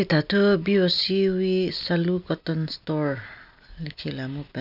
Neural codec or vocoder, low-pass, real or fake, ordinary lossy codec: none; 5.4 kHz; real; none